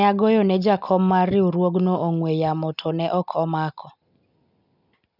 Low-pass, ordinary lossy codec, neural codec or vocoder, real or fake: 5.4 kHz; none; none; real